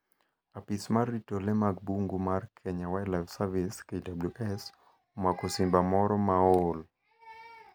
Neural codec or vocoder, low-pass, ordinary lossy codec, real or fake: none; none; none; real